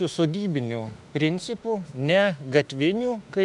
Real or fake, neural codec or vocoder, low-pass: fake; autoencoder, 48 kHz, 32 numbers a frame, DAC-VAE, trained on Japanese speech; 10.8 kHz